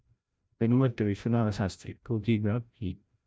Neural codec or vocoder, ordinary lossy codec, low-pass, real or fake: codec, 16 kHz, 0.5 kbps, FreqCodec, larger model; none; none; fake